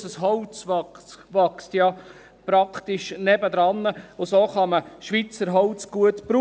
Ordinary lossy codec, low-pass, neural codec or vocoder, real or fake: none; none; none; real